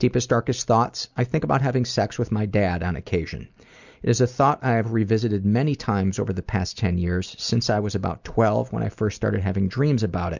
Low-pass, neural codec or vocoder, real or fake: 7.2 kHz; none; real